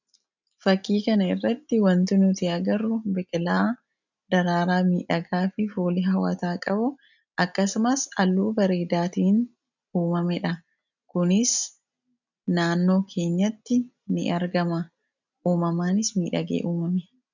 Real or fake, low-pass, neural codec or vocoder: real; 7.2 kHz; none